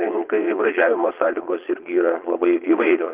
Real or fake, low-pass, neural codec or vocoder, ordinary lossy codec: fake; 3.6 kHz; vocoder, 22.05 kHz, 80 mel bands, Vocos; Opus, 32 kbps